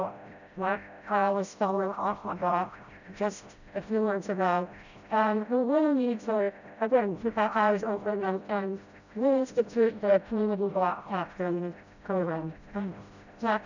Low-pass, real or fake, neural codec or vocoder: 7.2 kHz; fake; codec, 16 kHz, 0.5 kbps, FreqCodec, smaller model